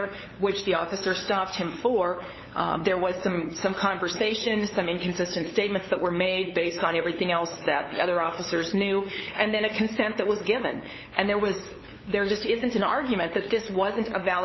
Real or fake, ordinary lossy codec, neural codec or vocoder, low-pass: fake; MP3, 24 kbps; codec, 16 kHz, 8 kbps, FunCodec, trained on LibriTTS, 25 frames a second; 7.2 kHz